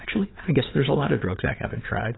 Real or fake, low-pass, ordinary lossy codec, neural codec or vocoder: fake; 7.2 kHz; AAC, 16 kbps; vocoder, 22.05 kHz, 80 mel bands, WaveNeXt